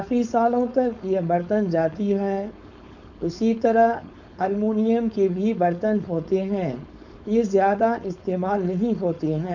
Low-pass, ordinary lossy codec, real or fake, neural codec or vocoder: 7.2 kHz; none; fake; codec, 16 kHz, 4.8 kbps, FACodec